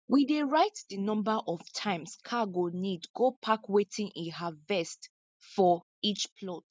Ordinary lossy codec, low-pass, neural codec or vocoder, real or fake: none; none; none; real